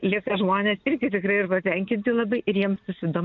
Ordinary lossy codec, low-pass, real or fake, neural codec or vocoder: MP3, 96 kbps; 7.2 kHz; real; none